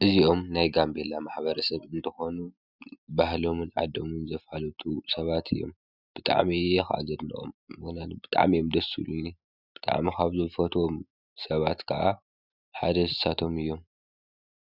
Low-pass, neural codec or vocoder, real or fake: 5.4 kHz; none; real